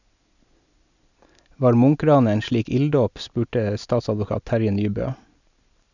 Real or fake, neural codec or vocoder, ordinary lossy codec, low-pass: real; none; none; 7.2 kHz